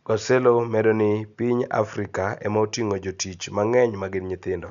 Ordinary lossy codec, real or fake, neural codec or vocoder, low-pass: none; real; none; 7.2 kHz